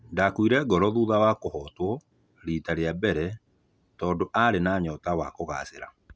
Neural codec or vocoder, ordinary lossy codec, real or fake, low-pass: none; none; real; none